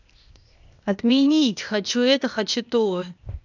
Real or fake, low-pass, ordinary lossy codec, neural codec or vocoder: fake; 7.2 kHz; none; codec, 16 kHz, 0.8 kbps, ZipCodec